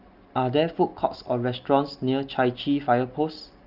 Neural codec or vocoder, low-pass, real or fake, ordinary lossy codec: none; 5.4 kHz; real; Opus, 24 kbps